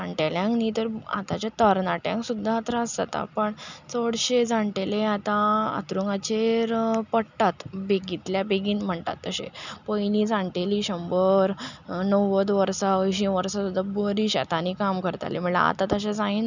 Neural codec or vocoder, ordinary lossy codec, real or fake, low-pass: none; none; real; 7.2 kHz